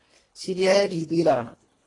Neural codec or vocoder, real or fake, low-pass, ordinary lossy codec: codec, 24 kHz, 1.5 kbps, HILCodec; fake; 10.8 kHz; AAC, 32 kbps